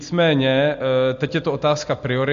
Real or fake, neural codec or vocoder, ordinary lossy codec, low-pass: real; none; MP3, 48 kbps; 7.2 kHz